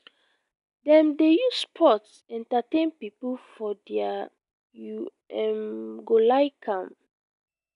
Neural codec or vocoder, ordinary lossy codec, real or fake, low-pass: none; none; real; 10.8 kHz